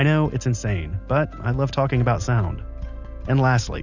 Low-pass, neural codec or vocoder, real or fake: 7.2 kHz; none; real